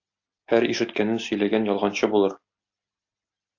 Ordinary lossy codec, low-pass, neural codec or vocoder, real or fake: AAC, 48 kbps; 7.2 kHz; none; real